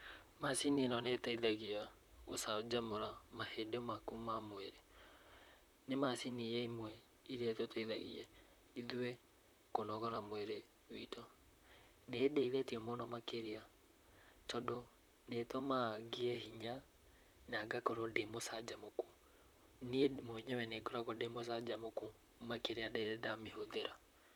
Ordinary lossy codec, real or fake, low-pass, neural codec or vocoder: none; fake; none; vocoder, 44.1 kHz, 128 mel bands, Pupu-Vocoder